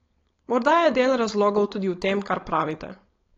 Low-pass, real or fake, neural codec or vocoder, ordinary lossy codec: 7.2 kHz; fake; codec, 16 kHz, 4.8 kbps, FACodec; AAC, 32 kbps